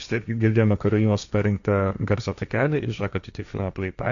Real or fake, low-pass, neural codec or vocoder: fake; 7.2 kHz; codec, 16 kHz, 1.1 kbps, Voila-Tokenizer